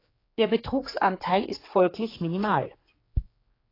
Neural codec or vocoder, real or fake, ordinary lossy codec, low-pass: codec, 16 kHz, 4 kbps, X-Codec, HuBERT features, trained on general audio; fake; AAC, 24 kbps; 5.4 kHz